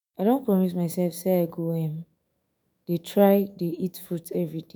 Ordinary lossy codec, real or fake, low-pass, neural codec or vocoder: none; fake; none; autoencoder, 48 kHz, 128 numbers a frame, DAC-VAE, trained on Japanese speech